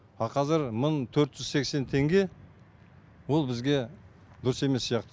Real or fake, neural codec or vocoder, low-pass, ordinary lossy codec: real; none; none; none